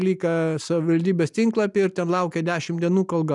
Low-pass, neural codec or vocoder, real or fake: 10.8 kHz; none; real